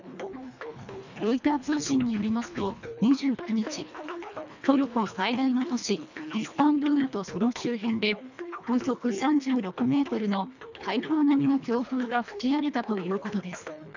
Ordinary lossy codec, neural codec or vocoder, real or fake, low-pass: none; codec, 24 kHz, 1.5 kbps, HILCodec; fake; 7.2 kHz